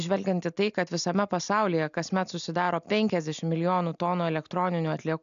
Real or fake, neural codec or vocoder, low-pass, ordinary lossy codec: real; none; 7.2 kHz; AAC, 96 kbps